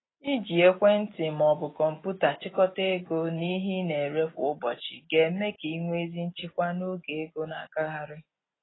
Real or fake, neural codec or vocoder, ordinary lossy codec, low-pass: real; none; AAC, 16 kbps; 7.2 kHz